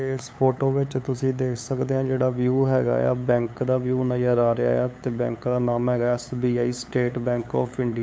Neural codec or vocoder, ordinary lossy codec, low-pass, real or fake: codec, 16 kHz, 8 kbps, FunCodec, trained on LibriTTS, 25 frames a second; none; none; fake